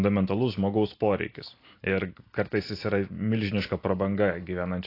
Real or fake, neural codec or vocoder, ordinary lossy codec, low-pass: real; none; AAC, 32 kbps; 5.4 kHz